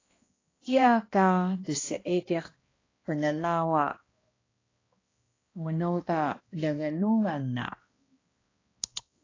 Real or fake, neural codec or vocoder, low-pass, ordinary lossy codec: fake; codec, 16 kHz, 1 kbps, X-Codec, HuBERT features, trained on balanced general audio; 7.2 kHz; AAC, 32 kbps